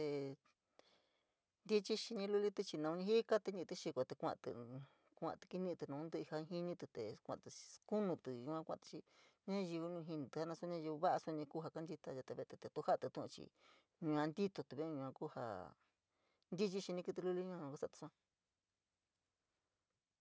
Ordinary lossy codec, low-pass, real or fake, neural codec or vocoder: none; none; real; none